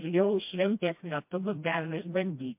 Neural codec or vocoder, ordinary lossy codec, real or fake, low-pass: codec, 16 kHz, 1 kbps, FreqCodec, smaller model; MP3, 32 kbps; fake; 3.6 kHz